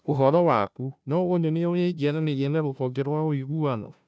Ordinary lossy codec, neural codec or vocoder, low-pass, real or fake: none; codec, 16 kHz, 0.5 kbps, FunCodec, trained on Chinese and English, 25 frames a second; none; fake